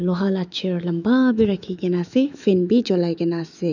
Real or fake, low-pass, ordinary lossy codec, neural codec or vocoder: fake; 7.2 kHz; none; autoencoder, 48 kHz, 128 numbers a frame, DAC-VAE, trained on Japanese speech